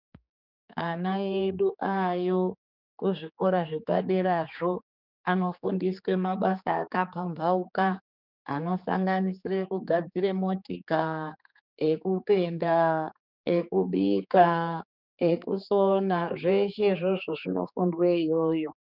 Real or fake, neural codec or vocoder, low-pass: fake; codec, 16 kHz, 4 kbps, X-Codec, HuBERT features, trained on general audio; 5.4 kHz